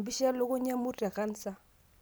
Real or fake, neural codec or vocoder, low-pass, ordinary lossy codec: real; none; none; none